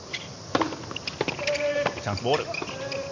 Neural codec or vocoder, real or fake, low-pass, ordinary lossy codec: none; real; 7.2 kHz; MP3, 48 kbps